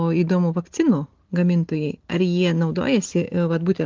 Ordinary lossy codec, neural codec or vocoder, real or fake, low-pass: Opus, 16 kbps; none; real; 7.2 kHz